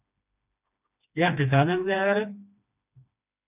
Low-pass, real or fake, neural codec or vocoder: 3.6 kHz; fake; codec, 16 kHz, 2 kbps, FreqCodec, smaller model